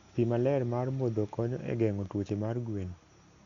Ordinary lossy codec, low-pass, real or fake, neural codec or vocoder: Opus, 64 kbps; 7.2 kHz; real; none